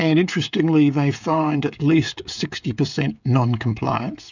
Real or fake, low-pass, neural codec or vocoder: fake; 7.2 kHz; codec, 16 kHz, 16 kbps, FreqCodec, smaller model